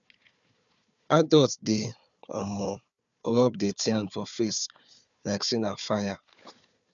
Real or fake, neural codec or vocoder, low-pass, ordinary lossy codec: fake; codec, 16 kHz, 4 kbps, FunCodec, trained on Chinese and English, 50 frames a second; 7.2 kHz; none